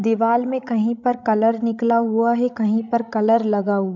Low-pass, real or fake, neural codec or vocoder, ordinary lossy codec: 7.2 kHz; fake; codec, 16 kHz, 16 kbps, FreqCodec, larger model; none